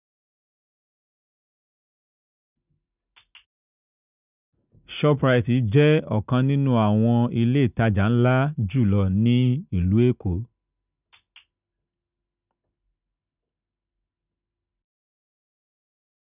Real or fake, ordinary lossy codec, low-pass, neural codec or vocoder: real; none; 3.6 kHz; none